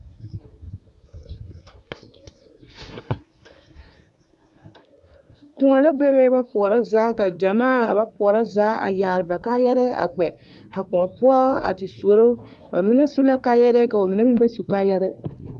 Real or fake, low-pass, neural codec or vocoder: fake; 10.8 kHz; codec, 24 kHz, 1 kbps, SNAC